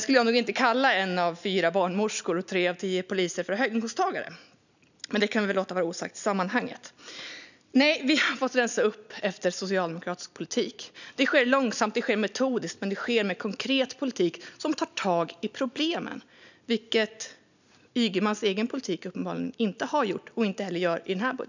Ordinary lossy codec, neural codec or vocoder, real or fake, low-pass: none; none; real; 7.2 kHz